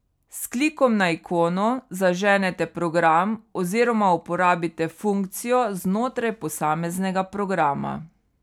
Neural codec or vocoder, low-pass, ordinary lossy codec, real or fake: none; 19.8 kHz; none; real